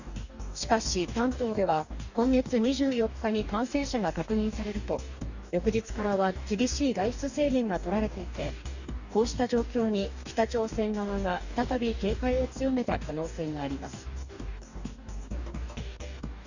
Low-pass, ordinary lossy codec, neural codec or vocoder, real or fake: 7.2 kHz; none; codec, 44.1 kHz, 2.6 kbps, DAC; fake